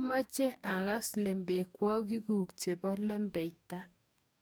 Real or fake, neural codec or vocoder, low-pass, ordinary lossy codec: fake; codec, 44.1 kHz, 2.6 kbps, DAC; none; none